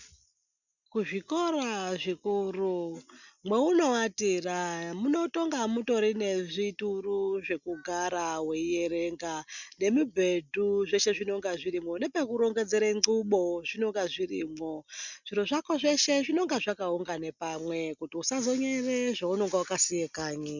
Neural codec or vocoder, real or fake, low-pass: none; real; 7.2 kHz